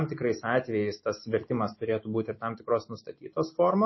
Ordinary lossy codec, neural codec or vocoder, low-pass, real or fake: MP3, 24 kbps; none; 7.2 kHz; real